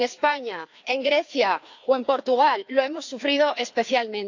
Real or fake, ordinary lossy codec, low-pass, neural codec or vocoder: fake; AAC, 48 kbps; 7.2 kHz; codec, 16 kHz, 2 kbps, FreqCodec, larger model